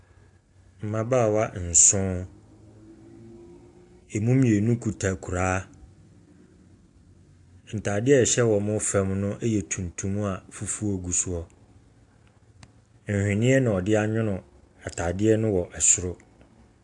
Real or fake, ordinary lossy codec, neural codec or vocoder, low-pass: real; AAC, 64 kbps; none; 10.8 kHz